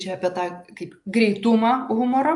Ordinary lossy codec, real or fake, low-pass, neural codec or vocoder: AAC, 64 kbps; real; 10.8 kHz; none